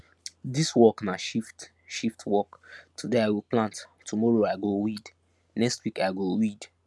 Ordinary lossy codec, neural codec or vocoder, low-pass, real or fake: none; none; none; real